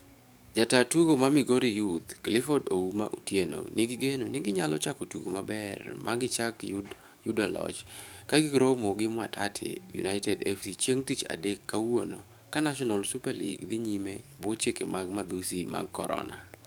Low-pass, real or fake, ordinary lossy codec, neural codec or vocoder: none; fake; none; codec, 44.1 kHz, 7.8 kbps, DAC